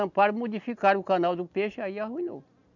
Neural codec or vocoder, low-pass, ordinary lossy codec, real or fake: none; 7.2 kHz; none; real